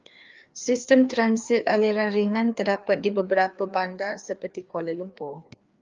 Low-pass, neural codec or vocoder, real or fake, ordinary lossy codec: 7.2 kHz; codec, 16 kHz, 2 kbps, FreqCodec, larger model; fake; Opus, 32 kbps